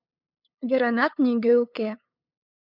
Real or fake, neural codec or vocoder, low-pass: fake; codec, 16 kHz, 8 kbps, FunCodec, trained on LibriTTS, 25 frames a second; 5.4 kHz